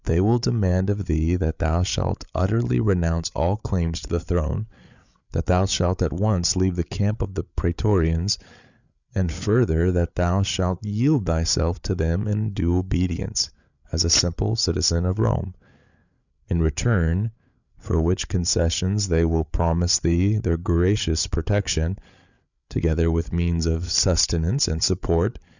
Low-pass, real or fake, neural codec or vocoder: 7.2 kHz; fake; codec, 16 kHz, 8 kbps, FreqCodec, larger model